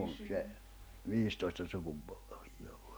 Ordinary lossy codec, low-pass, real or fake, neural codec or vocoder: none; none; real; none